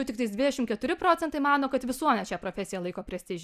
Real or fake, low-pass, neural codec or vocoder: real; 14.4 kHz; none